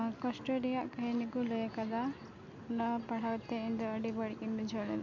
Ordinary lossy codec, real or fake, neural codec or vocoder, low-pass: MP3, 48 kbps; real; none; 7.2 kHz